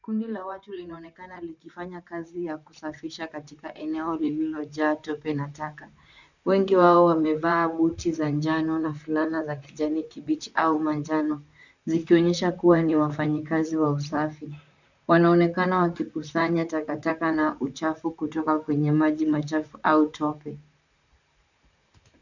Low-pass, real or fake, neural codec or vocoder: 7.2 kHz; fake; vocoder, 44.1 kHz, 128 mel bands, Pupu-Vocoder